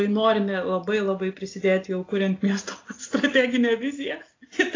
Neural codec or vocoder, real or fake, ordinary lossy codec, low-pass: none; real; AAC, 32 kbps; 7.2 kHz